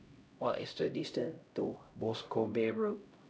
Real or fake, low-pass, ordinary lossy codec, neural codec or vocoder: fake; none; none; codec, 16 kHz, 0.5 kbps, X-Codec, HuBERT features, trained on LibriSpeech